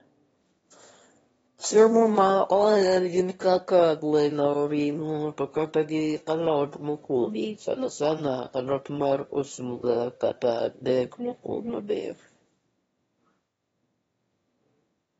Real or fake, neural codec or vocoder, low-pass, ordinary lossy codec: fake; autoencoder, 22.05 kHz, a latent of 192 numbers a frame, VITS, trained on one speaker; 9.9 kHz; AAC, 24 kbps